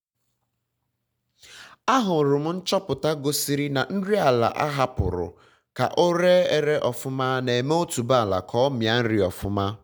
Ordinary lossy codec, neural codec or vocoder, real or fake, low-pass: none; none; real; none